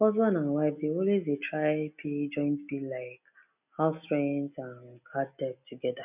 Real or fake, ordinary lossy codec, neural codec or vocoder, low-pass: real; none; none; 3.6 kHz